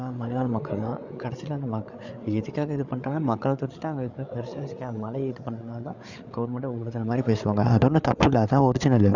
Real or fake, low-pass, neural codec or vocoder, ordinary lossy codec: fake; 7.2 kHz; codec, 16 kHz, 8 kbps, FreqCodec, larger model; none